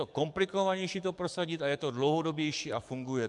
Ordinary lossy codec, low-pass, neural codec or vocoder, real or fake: MP3, 96 kbps; 10.8 kHz; codec, 44.1 kHz, 7.8 kbps, DAC; fake